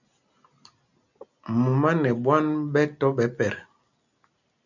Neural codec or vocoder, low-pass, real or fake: none; 7.2 kHz; real